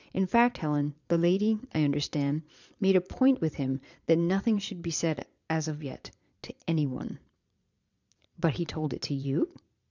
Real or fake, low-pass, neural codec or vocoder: fake; 7.2 kHz; vocoder, 22.05 kHz, 80 mel bands, Vocos